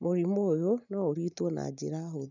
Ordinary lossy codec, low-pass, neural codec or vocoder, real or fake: none; 7.2 kHz; none; real